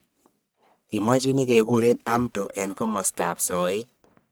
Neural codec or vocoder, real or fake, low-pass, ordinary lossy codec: codec, 44.1 kHz, 1.7 kbps, Pupu-Codec; fake; none; none